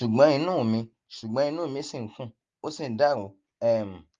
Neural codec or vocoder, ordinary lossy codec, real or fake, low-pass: none; Opus, 32 kbps; real; 7.2 kHz